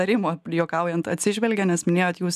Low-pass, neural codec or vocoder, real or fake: 14.4 kHz; none; real